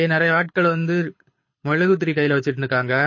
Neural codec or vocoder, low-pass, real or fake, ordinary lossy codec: codec, 24 kHz, 6 kbps, HILCodec; 7.2 kHz; fake; MP3, 32 kbps